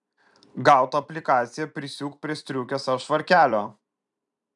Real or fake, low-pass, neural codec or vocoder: fake; 10.8 kHz; vocoder, 44.1 kHz, 128 mel bands every 256 samples, BigVGAN v2